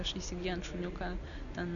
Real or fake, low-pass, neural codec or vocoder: real; 7.2 kHz; none